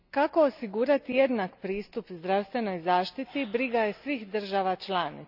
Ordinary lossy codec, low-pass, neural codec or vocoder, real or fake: none; 5.4 kHz; none; real